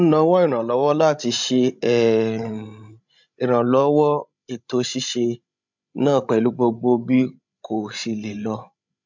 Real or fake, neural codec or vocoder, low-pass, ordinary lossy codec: fake; codec, 16 kHz, 16 kbps, FreqCodec, larger model; 7.2 kHz; MP3, 64 kbps